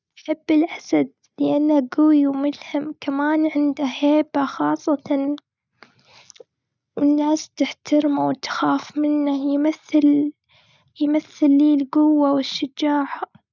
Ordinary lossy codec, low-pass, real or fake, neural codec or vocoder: none; 7.2 kHz; real; none